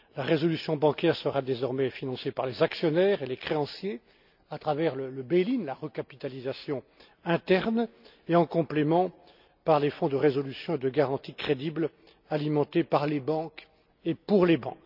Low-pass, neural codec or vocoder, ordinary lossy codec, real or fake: 5.4 kHz; none; none; real